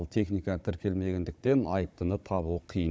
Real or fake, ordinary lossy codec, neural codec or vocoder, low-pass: fake; none; codec, 16 kHz, 16 kbps, FunCodec, trained on Chinese and English, 50 frames a second; none